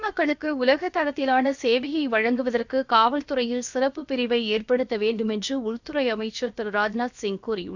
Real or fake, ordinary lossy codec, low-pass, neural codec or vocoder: fake; none; 7.2 kHz; codec, 16 kHz, about 1 kbps, DyCAST, with the encoder's durations